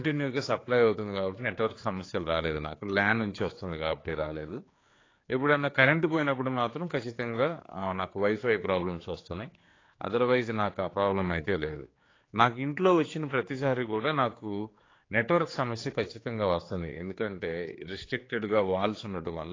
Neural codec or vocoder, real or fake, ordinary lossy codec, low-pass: codec, 16 kHz, 4 kbps, X-Codec, HuBERT features, trained on general audio; fake; AAC, 32 kbps; 7.2 kHz